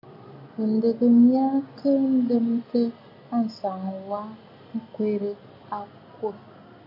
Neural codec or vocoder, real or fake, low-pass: none; real; 5.4 kHz